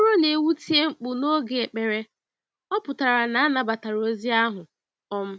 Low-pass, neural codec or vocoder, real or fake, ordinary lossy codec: none; none; real; none